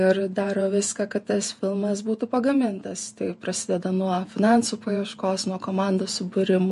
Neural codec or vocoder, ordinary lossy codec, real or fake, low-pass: vocoder, 48 kHz, 128 mel bands, Vocos; MP3, 48 kbps; fake; 14.4 kHz